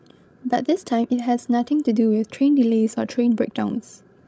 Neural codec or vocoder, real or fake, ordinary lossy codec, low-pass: codec, 16 kHz, 16 kbps, FreqCodec, larger model; fake; none; none